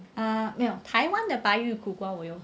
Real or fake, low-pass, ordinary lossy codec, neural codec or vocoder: real; none; none; none